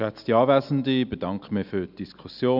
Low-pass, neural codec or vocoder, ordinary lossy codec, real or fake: 5.4 kHz; none; none; real